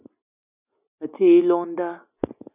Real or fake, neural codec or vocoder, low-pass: real; none; 3.6 kHz